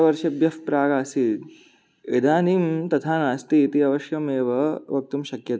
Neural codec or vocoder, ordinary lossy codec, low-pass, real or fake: none; none; none; real